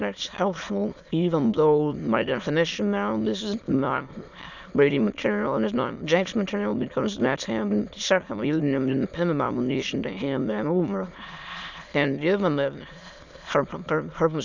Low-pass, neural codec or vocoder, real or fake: 7.2 kHz; autoencoder, 22.05 kHz, a latent of 192 numbers a frame, VITS, trained on many speakers; fake